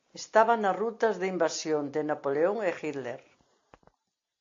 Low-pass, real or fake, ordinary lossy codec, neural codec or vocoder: 7.2 kHz; real; AAC, 48 kbps; none